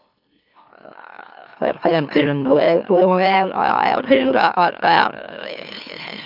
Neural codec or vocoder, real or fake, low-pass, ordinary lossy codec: autoencoder, 44.1 kHz, a latent of 192 numbers a frame, MeloTTS; fake; 5.4 kHz; MP3, 48 kbps